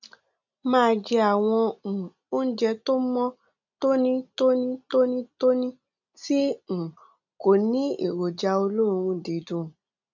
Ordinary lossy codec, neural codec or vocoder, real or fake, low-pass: none; none; real; 7.2 kHz